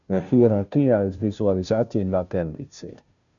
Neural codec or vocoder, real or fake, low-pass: codec, 16 kHz, 0.5 kbps, FunCodec, trained on Chinese and English, 25 frames a second; fake; 7.2 kHz